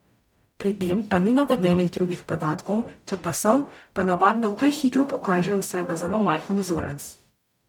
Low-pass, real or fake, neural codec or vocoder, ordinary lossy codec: 19.8 kHz; fake; codec, 44.1 kHz, 0.9 kbps, DAC; none